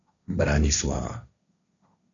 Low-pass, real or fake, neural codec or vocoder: 7.2 kHz; fake; codec, 16 kHz, 1.1 kbps, Voila-Tokenizer